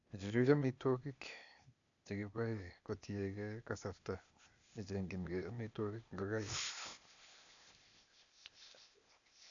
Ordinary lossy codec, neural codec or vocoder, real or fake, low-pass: none; codec, 16 kHz, 0.8 kbps, ZipCodec; fake; 7.2 kHz